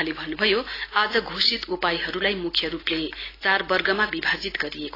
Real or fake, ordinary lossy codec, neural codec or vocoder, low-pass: real; AAC, 24 kbps; none; 5.4 kHz